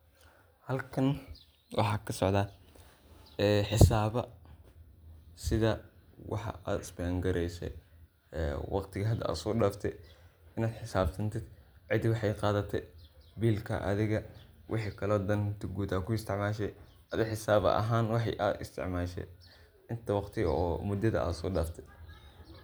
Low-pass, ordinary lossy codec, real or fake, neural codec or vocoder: none; none; real; none